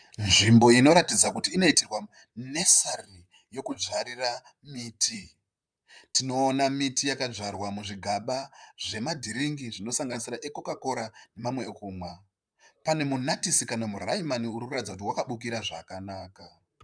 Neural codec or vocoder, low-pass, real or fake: vocoder, 44.1 kHz, 128 mel bands, Pupu-Vocoder; 9.9 kHz; fake